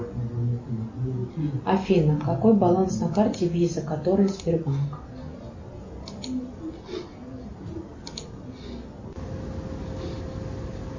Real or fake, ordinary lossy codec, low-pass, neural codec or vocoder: real; MP3, 32 kbps; 7.2 kHz; none